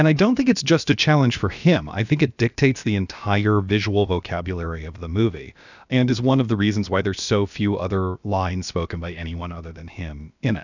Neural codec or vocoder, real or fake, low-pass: codec, 16 kHz, about 1 kbps, DyCAST, with the encoder's durations; fake; 7.2 kHz